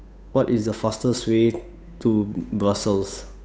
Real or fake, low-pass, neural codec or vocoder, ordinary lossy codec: fake; none; codec, 16 kHz, 8 kbps, FunCodec, trained on Chinese and English, 25 frames a second; none